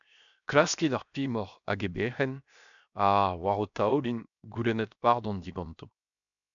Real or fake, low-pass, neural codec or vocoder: fake; 7.2 kHz; codec, 16 kHz, 0.7 kbps, FocalCodec